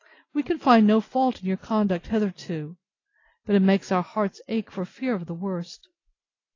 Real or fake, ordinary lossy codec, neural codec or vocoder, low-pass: real; AAC, 32 kbps; none; 7.2 kHz